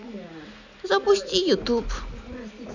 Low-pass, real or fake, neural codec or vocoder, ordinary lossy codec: 7.2 kHz; real; none; none